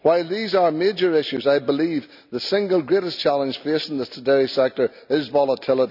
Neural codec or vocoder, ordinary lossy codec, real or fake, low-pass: none; none; real; 5.4 kHz